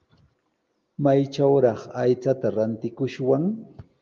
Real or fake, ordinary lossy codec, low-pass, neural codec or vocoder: real; Opus, 32 kbps; 7.2 kHz; none